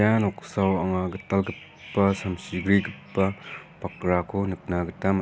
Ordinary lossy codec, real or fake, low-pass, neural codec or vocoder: none; real; none; none